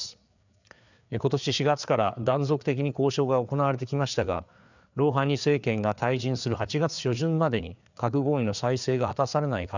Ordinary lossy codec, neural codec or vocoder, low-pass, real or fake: none; codec, 16 kHz, 4 kbps, FreqCodec, larger model; 7.2 kHz; fake